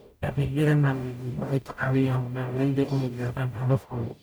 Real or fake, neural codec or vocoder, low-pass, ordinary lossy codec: fake; codec, 44.1 kHz, 0.9 kbps, DAC; none; none